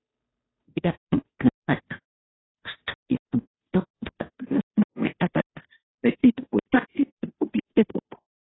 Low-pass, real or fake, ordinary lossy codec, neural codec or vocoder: 7.2 kHz; fake; AAC, 16 kbps; codec, 16 kHz, 0.5 kbps, FunCodec, trained on Chinese and English, 25 frames a second